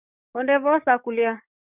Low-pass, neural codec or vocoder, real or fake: 3.6 kHz; codec, 44.1 kHz, 7.8 kbps, DAC; fake